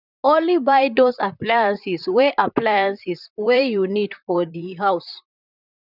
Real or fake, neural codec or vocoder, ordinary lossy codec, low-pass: fake; vocoder, 44.1 kHz, 128 mel bands, Pupu-Vocoder; none; 5.4 kHz